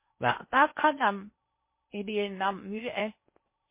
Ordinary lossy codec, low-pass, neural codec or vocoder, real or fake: MP3, 24 kbps; 3.6 kHz; codec, 16 kHz in and 24 kHz out, 0.6 kbps, FocalCodec, streaming, 4096 codes; fake